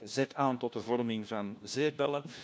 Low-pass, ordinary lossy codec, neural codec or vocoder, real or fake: none; none; codec, 16 kHz, 1 kbps, FunCodec, trained on LibriTTS, 50 frames a second; fake